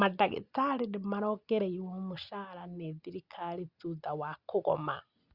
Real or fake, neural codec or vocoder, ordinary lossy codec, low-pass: real; none; Opus, 64 kbps; 5.4 kHz